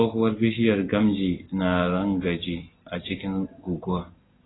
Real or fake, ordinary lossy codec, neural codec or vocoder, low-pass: real; AAC, 16 kbps; none; 7.2 kHz